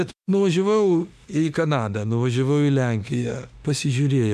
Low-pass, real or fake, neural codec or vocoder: 14.4 kHz; fake; autoencoder, 48 kHz, 32 numbers a frame, DAC-VAE, trained on Japanese speech